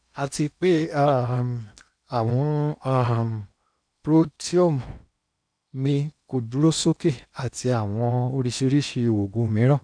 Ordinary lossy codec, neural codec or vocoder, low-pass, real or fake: none; codec, 16 kHz in and 24 kHz out, 0.8 kbps, FocalCodec, streaming, 65536 codes; 9.9 kHz; fake